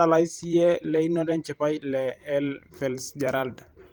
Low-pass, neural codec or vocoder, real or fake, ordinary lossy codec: 19.8 kHz; vocoder, 44.1 kHz, 128 mel bands every 512 samples, BigVGAN v2; fake; Opus, 32 kbps